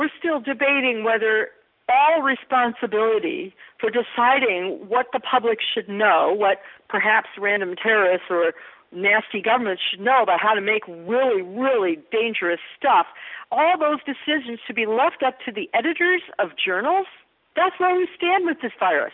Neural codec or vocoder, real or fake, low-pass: none; real; 5.4 kHz